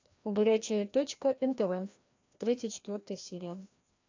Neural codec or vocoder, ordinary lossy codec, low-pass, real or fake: codec, 16 kHz, 1 kbps, FreqCodec, larger model; AAC, 48 kbps; 7.2 kHz; fake